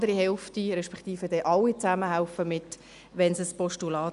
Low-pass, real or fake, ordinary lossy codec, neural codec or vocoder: 10.8 kHz; real; none; none